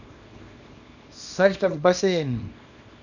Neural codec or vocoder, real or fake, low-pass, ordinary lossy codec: codec, 24 kHz, 0.9 kbps, WavTokenizer, small release; fake; 7.2 kHz; none